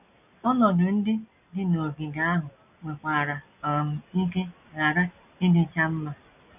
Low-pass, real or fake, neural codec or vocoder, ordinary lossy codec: 3.6 kHz; real; none; none